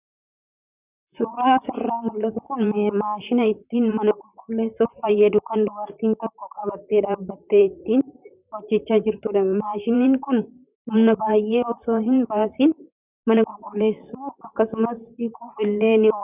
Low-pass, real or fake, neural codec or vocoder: 3.6 kHz; fake; vocoder, 44.1 kHz, 128 mel bands, Pupu-Vocoder